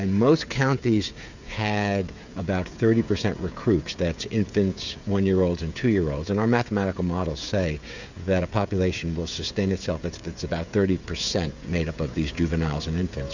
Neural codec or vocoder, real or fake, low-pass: codec, 16 kHz, 16 kbps, FreqCodec, smaller model; fake; 7.2 kHz